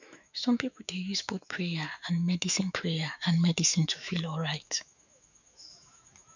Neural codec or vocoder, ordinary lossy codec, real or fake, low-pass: autoencoder, 48 kHz, 128 numbers a frame, DAC-VAE, trained on Japanese speech; none; fake; 7.2 kHz